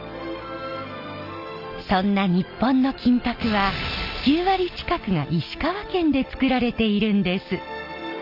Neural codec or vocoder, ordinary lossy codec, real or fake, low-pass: none; Opus, 24 kbps; real; 5.4 kHz